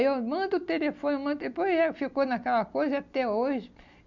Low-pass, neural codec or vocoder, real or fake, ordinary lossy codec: 7.2 kHz; none; real; none